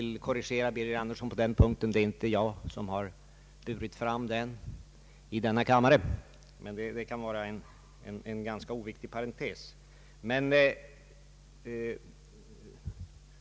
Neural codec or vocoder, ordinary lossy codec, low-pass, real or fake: none; none; none; real